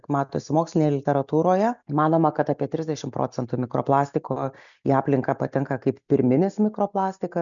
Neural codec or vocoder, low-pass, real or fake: none; 7.2 kHz; real